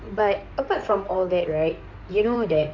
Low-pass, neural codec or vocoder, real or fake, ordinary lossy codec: 7.2 kHz; codec, 16 kHz in and 24 kHz out, 2.2 kbps, FireRedTTS-2 codec; fake; none